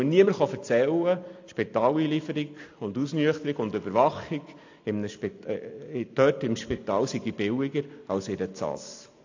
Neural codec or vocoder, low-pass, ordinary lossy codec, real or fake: none; 7.2 kHz; AAC, 48 kbps; real